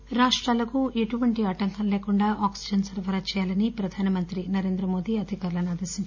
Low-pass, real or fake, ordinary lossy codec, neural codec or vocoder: 7.2 kHz; real; none; none